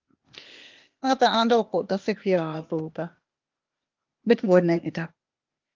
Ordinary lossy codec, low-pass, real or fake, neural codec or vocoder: Opus, 32 kbps; 7.2 kHz; fake; codec, 16 kHz, 0.8 kbps, ZipCodec